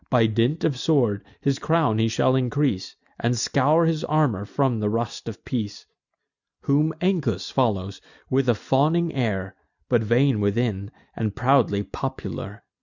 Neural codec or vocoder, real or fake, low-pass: none; real; 7.2 kHz